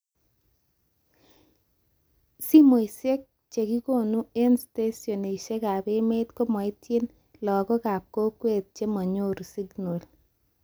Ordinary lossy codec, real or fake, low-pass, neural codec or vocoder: none; real; none; none